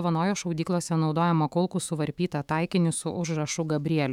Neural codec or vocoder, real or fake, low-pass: autoencoder, 48 kHz, 128 numbers a frame, DAC-VAE, trained on Japanese speech; fake; 19.8 kHz